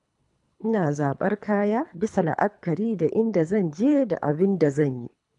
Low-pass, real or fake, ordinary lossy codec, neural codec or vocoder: 10.8 kHz; fake; none; codec, 24 kHz, 3 kbps, HILCodec